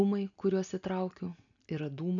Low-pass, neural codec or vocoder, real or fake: 7.2 kHz; none; real